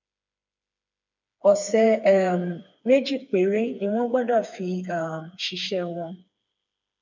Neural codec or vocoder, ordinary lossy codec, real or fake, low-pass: codec, 16 kHz, 4 kbps, FreqCodec, smaller model; none; fake; 7.2 kHz